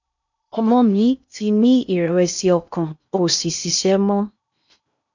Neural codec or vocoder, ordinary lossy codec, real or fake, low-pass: codec, 16 kHz in and 24 kHz out, 0.6 kbps, FocalCodec, streaming, 2048 codes; none; fake; 7.2 kHz